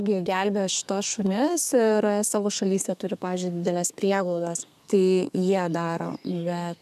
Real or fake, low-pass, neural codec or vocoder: fake; 14.4 kHz; codec, 32 kHz, 1.9 kbps, SNAC